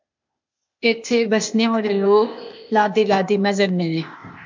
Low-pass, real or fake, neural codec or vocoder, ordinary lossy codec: 7.2 kHz; fake; codec, 16 kHz, 0.8 kbps, ZipCodec; MP3, 64 kbps